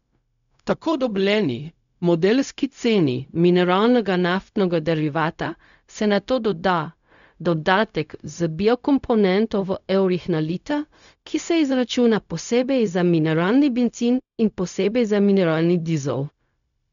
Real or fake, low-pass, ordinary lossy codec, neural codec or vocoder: fake; 7.2 kHz; none; codec, 16 kHz, 0.4 kbps, LongCat-Audio-Codec